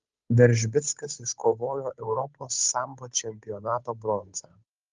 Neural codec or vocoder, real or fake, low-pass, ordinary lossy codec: codec, 16 kHz, 8 kbps, FunCodec, trained on Chinese and English, 25 frames a second; fake; 7.2 kHz; Opus, 32 kbps